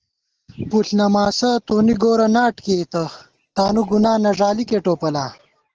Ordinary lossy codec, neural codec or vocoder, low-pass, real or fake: Opus, 16 kbps; none; 7.2 kHz; real